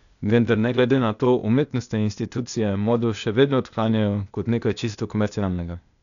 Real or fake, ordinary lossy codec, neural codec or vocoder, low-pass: fake; none; codec, 16 kHz, 0.8 kbps, ZipCodec; 7.2 kHz